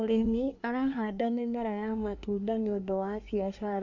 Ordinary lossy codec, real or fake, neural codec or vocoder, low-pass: none; fake; codec, 24 kHz, 1 kbps, SNAC; 7.2 kHz